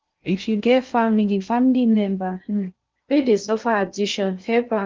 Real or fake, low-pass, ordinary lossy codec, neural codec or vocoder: fake; 7.2 kHz; Opus, 32 kbps; codec, 16 kHz in and 24 kHz out, 0.6 kbps, FocalCodec, streaming, 2048 codes